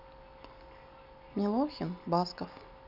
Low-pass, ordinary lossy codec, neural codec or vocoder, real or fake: 5.4 kHz; none; none; real